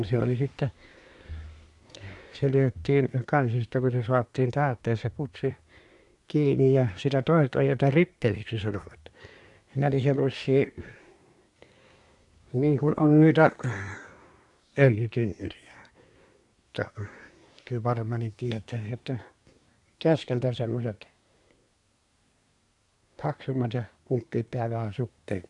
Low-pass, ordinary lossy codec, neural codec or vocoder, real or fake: 10.8 kHz; none; codec, 24 kHz, 1 kbps, SNAC; fake